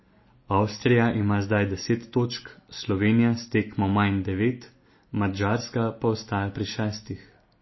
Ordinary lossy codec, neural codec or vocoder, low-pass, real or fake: MP3, 24 kbps; none; 7.2 kHz; real